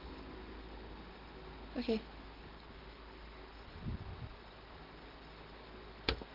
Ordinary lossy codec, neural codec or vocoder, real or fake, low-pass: Opus, 16 kbps; none; real; 5.4 kHz